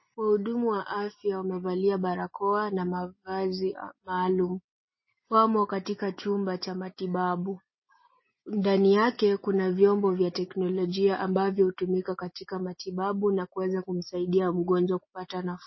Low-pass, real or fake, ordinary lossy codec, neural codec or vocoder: 7.2 kHz; real; MP3, 24 kbps; none